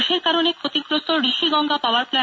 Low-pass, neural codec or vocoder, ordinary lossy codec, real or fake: none; none; none; real